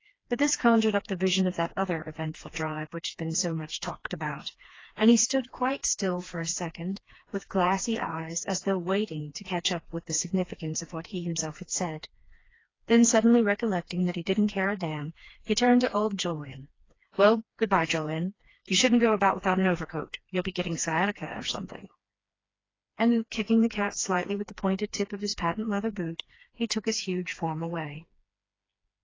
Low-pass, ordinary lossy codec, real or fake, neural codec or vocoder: 7.2 kHz; AAC, 32 kbps; fake; codec, 16 kHz, 2 kbps, FreqCodec, smaller model